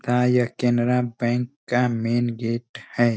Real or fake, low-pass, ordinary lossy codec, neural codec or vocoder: real; none; none; none